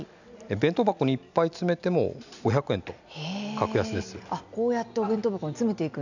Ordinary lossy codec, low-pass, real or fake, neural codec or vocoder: none; 7.2 kHz; real; none